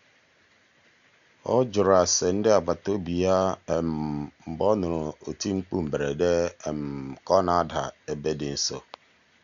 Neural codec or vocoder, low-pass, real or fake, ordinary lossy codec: none; 7.2 kHz; real; none